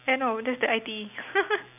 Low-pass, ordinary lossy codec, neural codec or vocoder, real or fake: 3.6 kHz; none; none; real